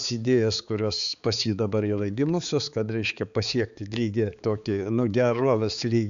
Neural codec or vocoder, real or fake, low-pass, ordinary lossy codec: codec, 16 kHz, 4 kbps, X-Codec, HuBERT features, trained on balanced general audio; fake; 7.2 kHz; AAC, 96 kbps